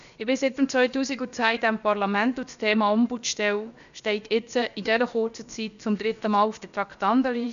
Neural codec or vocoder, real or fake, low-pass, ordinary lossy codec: codec, 16 kHz, about 1 kbps, DyCAST, with the encoder's durations; fake; 7.2 kHz; none